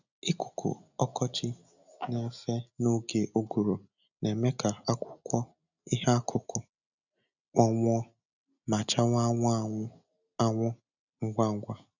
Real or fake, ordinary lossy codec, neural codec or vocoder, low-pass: real; none; none; 7.2 kHz